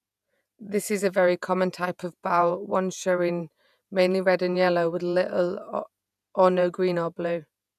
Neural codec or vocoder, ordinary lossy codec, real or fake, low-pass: vocoder, 48 kHz, 128 mel bands, Vocos; none; fake; 14.4 kHz